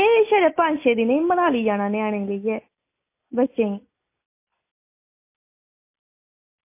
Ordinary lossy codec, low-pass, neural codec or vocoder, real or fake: MP3, 24 kbps; 3.6 kHz; none; real